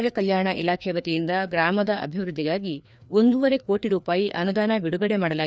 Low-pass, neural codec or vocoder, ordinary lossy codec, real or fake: none; codec, 16 kHz, 2 kbps, FreqCodec, larger model; none; fake